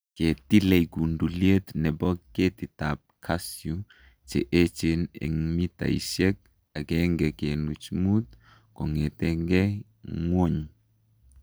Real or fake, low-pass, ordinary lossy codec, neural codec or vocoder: real; none; none; none